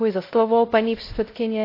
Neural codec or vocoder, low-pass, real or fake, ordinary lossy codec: codec, 16 kHz, 0.5 kbps, X-Codec, WavLM features, trained on Multilingual LibriSpeech; 5.4 kHz; fake; AAC, 32 kbps